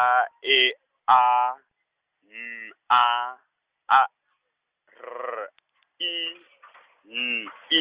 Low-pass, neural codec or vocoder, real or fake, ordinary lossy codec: 3.6 kHz; none; real; Opus, 24 kbps